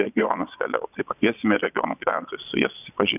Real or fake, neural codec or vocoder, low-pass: fake; vocoder, 22.05 kHz, 80 mel bands, Vocos; 3.6 kHz